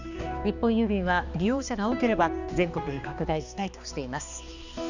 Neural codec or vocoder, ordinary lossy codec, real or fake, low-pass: codec, 16 kHz, 2 kbps, X-Codec, HuBERT features, trained on balanced general audio; none; fake; 7.2 kHz